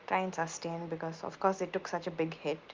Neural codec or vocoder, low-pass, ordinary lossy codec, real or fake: none; 7.2 kHz; Opus, 32 kbps; real